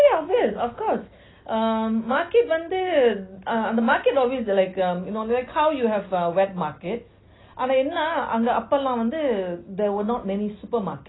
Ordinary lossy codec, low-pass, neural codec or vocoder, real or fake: AAC, 16 kbps; 7.2 kHz; none; real